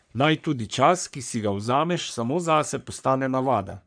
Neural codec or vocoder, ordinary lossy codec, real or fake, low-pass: codec, 44.1 kHz, 3.4 kbps, Pupu-Codec; none; fake; 9.9 kHz